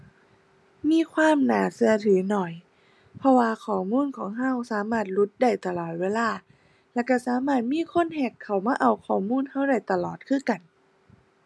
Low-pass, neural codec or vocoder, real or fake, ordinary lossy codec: none; none; real; none